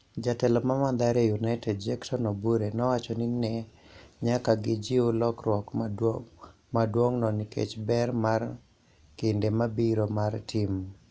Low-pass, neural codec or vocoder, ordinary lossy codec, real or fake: none; none; none; real